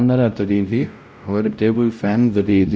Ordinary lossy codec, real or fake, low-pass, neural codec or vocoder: none; fake; none; codec, 16 kHz, 0.5 kbps, X-Codec, WavLM features, trained on Multilingual LibriSpeech